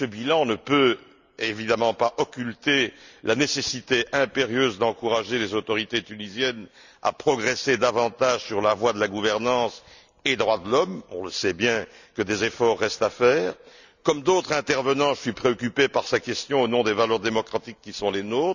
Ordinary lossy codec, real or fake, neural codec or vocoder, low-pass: none; real; none; 7.2 kHz